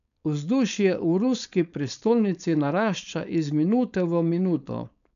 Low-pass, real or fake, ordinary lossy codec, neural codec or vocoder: 7.2 kHz; fake; none; codec, 16 kHz, 4.8 kbps, FACodec